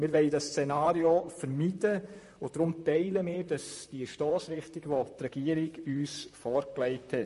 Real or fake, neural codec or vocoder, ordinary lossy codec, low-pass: fake; vocoder, 44.1 kHz, 128 mel bands, Pupu-Vocoder; MP3, 48 kbps; 14.4 kHz